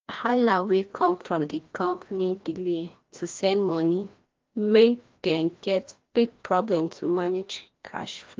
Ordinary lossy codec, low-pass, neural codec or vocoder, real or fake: Opus, 32 kbps; 7.2 kHz; codec, 16 kHz, 1 kbps, FreqCodec, larger model; fake